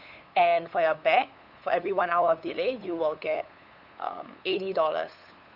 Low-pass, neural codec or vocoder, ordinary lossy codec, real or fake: 5.4 kHz; codec, 16 kHz, 8 kbps, FunCodec, trained on LibriTTS, 25 frames a second; none; fake